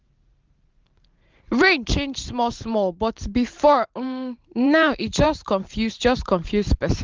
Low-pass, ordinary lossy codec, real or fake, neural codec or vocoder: 7.2 kHz; Opus, 32 kbps; fake; vocoder, 44.1 kHz, 128 mel bands every 512 samples, BigVGAN v2